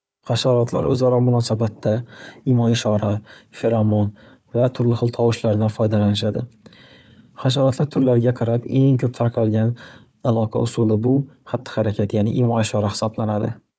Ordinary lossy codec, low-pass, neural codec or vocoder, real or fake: none; none; codec, 16 kHz, 4 kbps, FunCodec, trained on Chinese and English, 50 frames a second; fake